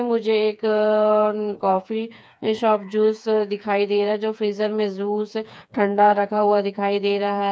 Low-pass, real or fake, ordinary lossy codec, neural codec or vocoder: none; fake; none; codec, 16 kHz, 4 kbps, FreqCodec, smaller model